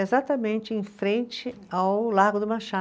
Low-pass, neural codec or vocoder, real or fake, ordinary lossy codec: none; none; real; none